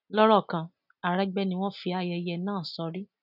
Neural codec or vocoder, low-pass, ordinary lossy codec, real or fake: none; 5.4 kHz; none; real